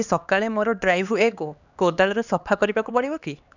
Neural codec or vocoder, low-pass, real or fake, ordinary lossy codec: codec, 16 kHz, 2 kbps, X-Codec, HuBERT features, trained on LibriSpeech; 7.2 kHz; fake; none